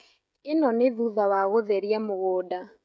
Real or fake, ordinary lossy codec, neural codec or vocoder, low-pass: fake; none; codec, 16 kHz, 16 kbps, FreqCodec, smaller model; none